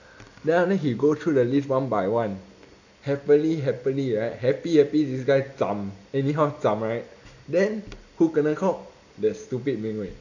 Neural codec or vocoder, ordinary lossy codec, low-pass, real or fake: none; none; 7.2 kHz; real